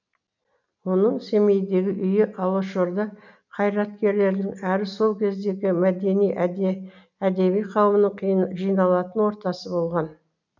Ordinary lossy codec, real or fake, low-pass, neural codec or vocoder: none; real; 7.2 kHz; none